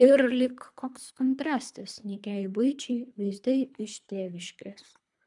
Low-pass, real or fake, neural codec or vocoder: 10.8 kHz; fake; codec, 24 kHz, 3 kbps, HILCodec